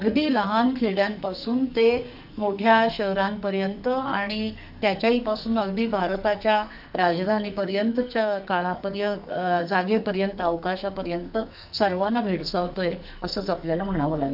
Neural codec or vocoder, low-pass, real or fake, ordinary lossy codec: codec, 44.1 kHz, 2.6 kbps, SNAC; 5.4 kHz; fake; none